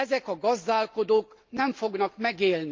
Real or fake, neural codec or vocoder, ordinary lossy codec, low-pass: real; none; Opus, 24 kbps; 7.2 kHz